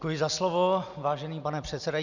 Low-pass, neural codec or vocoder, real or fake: 7.2 kHz; none; real